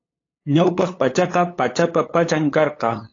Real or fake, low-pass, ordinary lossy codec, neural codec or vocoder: fake; 7.2 kHz; AAC, 32 kbps; codec, 16 kHz, 8 kbps, FunCodec, trained on LibriTTS, 25 frames a second